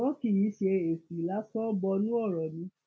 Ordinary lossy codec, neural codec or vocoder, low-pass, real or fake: none; none; none; real